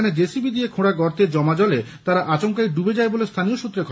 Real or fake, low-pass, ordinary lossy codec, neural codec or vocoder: real; none; none; none